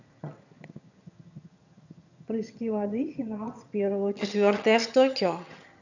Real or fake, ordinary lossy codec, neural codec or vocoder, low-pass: fake; none; vocoder, 22.05 kHz, 80 mel bands, HiFi-GAN; 7.2 kHz